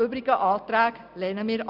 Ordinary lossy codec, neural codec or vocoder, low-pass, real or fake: none; none; 5.4 kHz; real